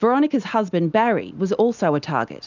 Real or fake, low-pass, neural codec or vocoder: real; 7.2 kHz; none